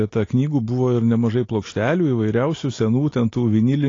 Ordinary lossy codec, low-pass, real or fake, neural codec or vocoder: AAC, 32 kbps; 7.2 kHz; real; none